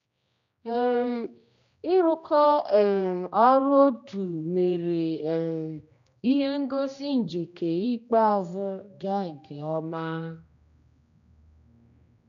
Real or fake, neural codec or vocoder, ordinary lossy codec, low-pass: fake; codec, 16 kHz, 1 kbps, X-Codec, HuBERT features, trained on general audio; none; 7.2 kHz